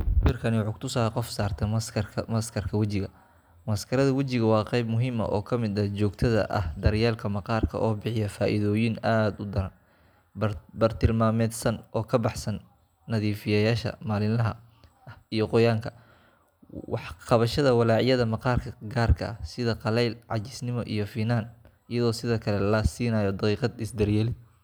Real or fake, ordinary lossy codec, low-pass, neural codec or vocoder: real; none; none; none